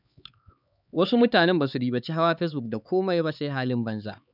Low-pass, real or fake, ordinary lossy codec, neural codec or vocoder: 5.4 kHz; fake; none; codec, 16 kHz, 4 kbps, X-Codec, HuBERT features, trained on LibriSpeech